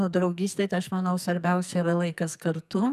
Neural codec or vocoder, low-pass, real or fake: codec, 44.1 kHz, 2.6 kbps, SNAC; 14.4 kHz; fake